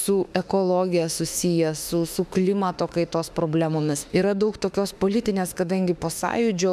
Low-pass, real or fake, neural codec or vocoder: 14.4 kHz; fake; autoencoder, 48 kHz, 32 numbers a frame, DAC-VAE, trained on Japanese speech